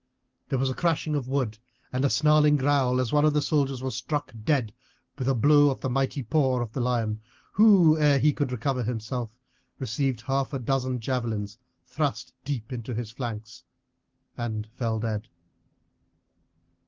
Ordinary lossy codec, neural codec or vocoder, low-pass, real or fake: Opus, 16 kbps; none; 7.2 kHz; real